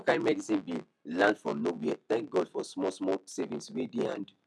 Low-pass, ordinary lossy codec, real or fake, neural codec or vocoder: none; none; real; none